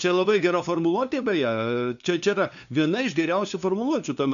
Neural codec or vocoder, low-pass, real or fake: codec, 16 kHz, 2 kbps, FunCodec, trained on LibriTTS, 25 frames a second; 7.2 kHz; fake